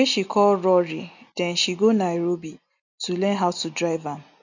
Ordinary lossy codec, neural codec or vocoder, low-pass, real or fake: none; none; 7.2 kHz; real